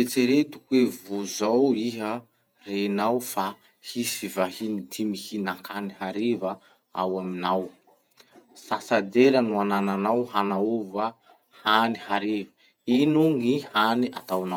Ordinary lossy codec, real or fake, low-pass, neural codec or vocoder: none; fake; 19.8 kHz; vocoder, 48 kHz, 128 mel bands, Vocos